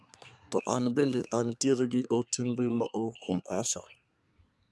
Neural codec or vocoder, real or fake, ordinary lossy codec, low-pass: codec, 24 kHz, 1 kbps, SNAC; fake; none; none